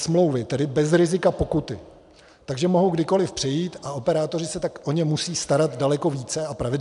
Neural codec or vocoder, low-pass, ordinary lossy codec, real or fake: none; 10.8 kHz; MP3, 96 kbps; real